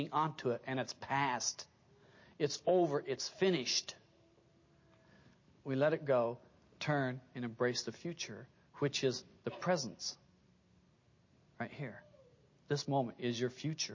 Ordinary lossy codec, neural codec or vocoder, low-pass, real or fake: MP3, 32 kbps; none; 7.2 kHz; real